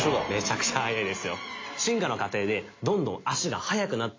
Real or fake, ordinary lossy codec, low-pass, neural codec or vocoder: real; AAC, 32 kbps; 7.2 kHz; none